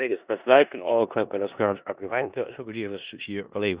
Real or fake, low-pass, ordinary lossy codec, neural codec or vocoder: fake; 3.6 kHz; Opus, 64 kbps; codec, 16 kHz in and 24 kHz out, 0.4 kbps, LongCat-Audio-Codec, four codebook decoder